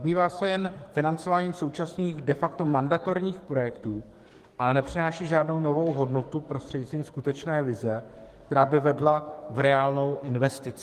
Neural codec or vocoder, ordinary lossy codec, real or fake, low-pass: codec, 44.1 kHz, 2.6 kbps, SNAC; Opus, 24 kbps; fake; 14.4 kHz